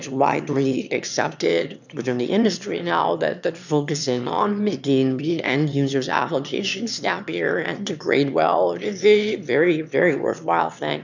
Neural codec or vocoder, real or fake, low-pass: autoencoder, 22.05 kHz, a latent of 192 numbers a frame, VITS, trained on one speaker; fake; 7.2 kHz